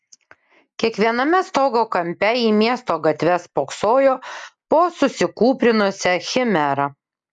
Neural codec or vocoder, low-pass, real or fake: none; 10.8 kHz; real